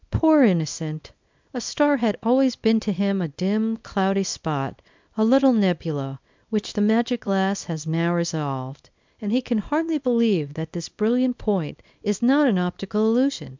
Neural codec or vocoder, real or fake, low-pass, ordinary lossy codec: codec, 24 kHz, 0.9 kbps, WavTokenizer, small release; fake; 7.2 kHz; MP3, 64 kbps